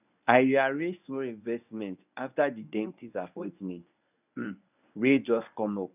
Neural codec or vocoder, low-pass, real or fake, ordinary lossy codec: codec, 24 kHz, 0.9 kbps, WavTokenizer, medium speech release version 1; 3.6 kHz; fake; none